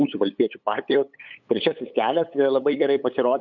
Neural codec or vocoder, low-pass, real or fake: codec, 16 kHz, 8 kbps, FunCodec, trained on LibriTTS, 25 frames a second; 7.2 kHz; fake